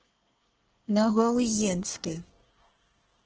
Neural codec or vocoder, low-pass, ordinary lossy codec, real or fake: codec, 24 kHz, 1 kbps, SNAC; 7.2 kHz; Opus, 16 kbps; fake